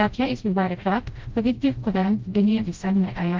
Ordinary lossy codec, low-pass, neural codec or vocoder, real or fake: Opus, 32 kbps; 7.2 kHz; codec, 16 kHz, 0.5 kbps, FreqCodec, smaller model; fake